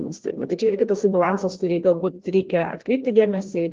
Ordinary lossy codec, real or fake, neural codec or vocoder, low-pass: Opus, 16 kbps; fake; codec, 16 kHz, 1 kbps, FreqCodec, larger model; 7.2 kHz